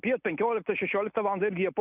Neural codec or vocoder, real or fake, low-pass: none; real; 3.6 kHz